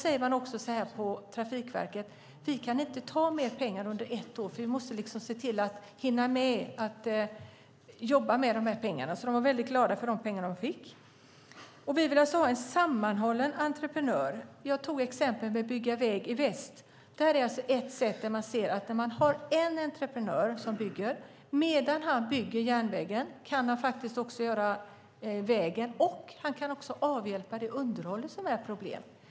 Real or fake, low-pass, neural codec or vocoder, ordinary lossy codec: real; none; none; none